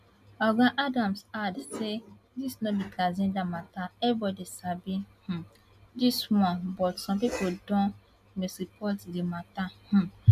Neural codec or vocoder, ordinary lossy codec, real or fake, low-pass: none; MP3, 96 kbps; real; 14.4 kHz